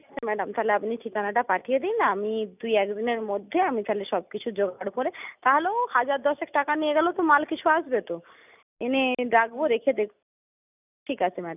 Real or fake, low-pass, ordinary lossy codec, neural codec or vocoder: real; 3.6 kHz; none; none